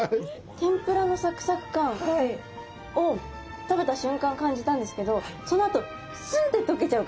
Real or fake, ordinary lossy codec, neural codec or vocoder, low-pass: real; none; none; none